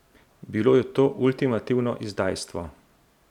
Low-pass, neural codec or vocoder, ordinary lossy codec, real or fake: 19.8 kHz; vocoder, 44.1 kHz, 128 mel bands every 512 samples, BigVGAN v2; none; fake